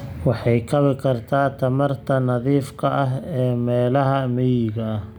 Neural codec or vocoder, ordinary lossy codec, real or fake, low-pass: none; none; real; none